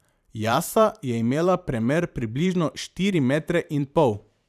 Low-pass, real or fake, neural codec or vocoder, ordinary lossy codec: 14.4 kHz; fake; vocoder, 44.1 kHz, 128 mel bands every 512 samples, BigVGAN v2; none